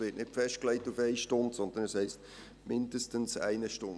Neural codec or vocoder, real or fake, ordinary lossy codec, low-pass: none; real; none; none